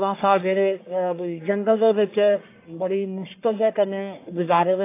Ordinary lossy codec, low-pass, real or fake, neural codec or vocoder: AAC, 24 kbps; 3.6 kHz; fake; codec, 44.1 kHz, 1.7 kbps, Pupu-Codec